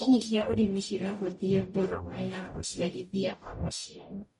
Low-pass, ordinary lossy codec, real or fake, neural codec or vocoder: 19.8 kHz; MP3, 48 kbps; fake; codec, 44.1 kHz, 0.9 kbps, DAC